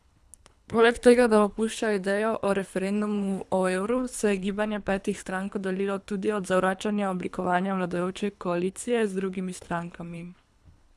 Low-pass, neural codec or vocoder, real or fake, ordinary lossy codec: none; codec, 24 kHz, 3 kbps, HILCodec; fake; none